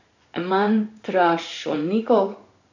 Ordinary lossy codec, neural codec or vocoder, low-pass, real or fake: none; codec, 16 kHz in and 24 kHz out, 1 kbps, XY-Tokenizer; 7.2 kHz; fake